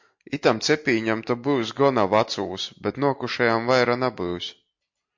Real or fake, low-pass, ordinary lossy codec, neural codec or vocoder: real; 7.2 kHz; MP3, 48 kbps; none